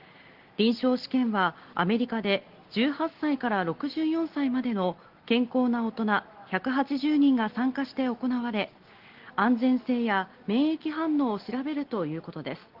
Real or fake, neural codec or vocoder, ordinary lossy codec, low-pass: real; none; Opus, 16 kbps; 5.4 kHz